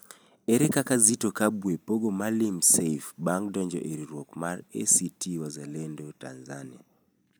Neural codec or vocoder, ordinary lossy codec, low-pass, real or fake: none; none; none; real